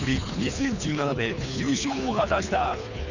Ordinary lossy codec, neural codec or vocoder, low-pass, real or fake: none; codec, 24 kHz, 3 kbps, HILCodec; 7.2 kHz; fake